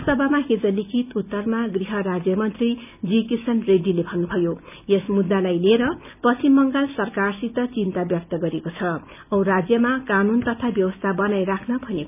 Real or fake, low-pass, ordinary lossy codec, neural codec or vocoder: real; 3.6 kHz; none; none